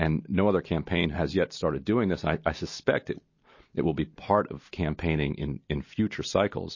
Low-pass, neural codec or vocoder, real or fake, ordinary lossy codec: 7.2 kHz; none; real; MP3, 32 kbps